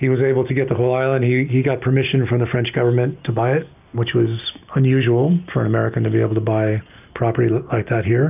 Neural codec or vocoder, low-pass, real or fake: none; 3.6 kHz; real